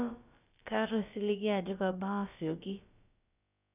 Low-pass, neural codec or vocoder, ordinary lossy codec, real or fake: 3.6 kHz; codec, 16 kHz, about 1 kbps, DyCAST, with the encoder's durations; none; fake